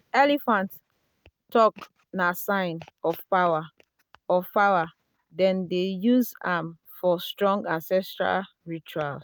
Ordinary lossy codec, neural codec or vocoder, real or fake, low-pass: none; none; real; none